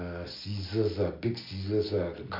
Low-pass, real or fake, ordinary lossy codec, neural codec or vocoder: 5.4 kHz; real; MP3, 48 kbps; none